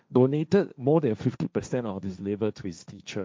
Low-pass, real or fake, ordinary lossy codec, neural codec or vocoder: none; fake; none; codec, 16 kHz, 1.1 kbps, Voila-Tokenizer